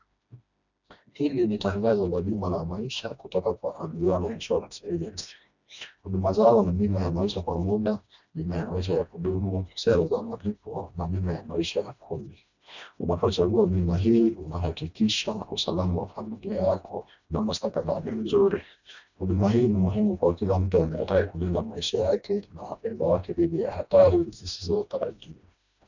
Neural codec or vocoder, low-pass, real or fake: codec, 16 kHz, 1 kbps, FreqCodec, smaller model; 7.2 kHz; fake